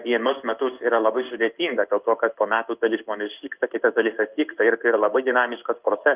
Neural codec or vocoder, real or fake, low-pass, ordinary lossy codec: codec, 16 kHz in and 24 kHz out, 1 kbps, XY-Tokenizer; fake; 3.6 kHz; Opus, 32 kbps